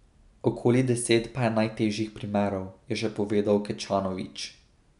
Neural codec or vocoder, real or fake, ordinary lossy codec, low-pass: none; real; none; 10.8 kHz